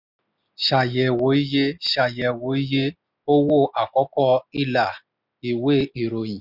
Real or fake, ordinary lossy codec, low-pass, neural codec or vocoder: real; none; 5.4 kHz; none